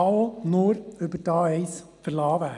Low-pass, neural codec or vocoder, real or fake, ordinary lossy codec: 10.8 kHz; none; real; none